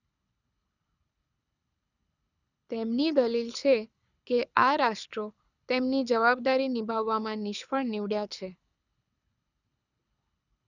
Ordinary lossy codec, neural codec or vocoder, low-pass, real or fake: none; codec, 24 kHz, 6 kbps, HILCodec; 7.2 kHz; fake